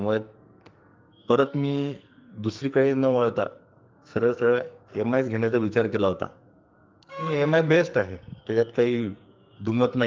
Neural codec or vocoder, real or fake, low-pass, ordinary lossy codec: codec, 44.1 kHz, 2.6 kbps, SNAC; fake; 7.2 kHz; Opus, 32 kbps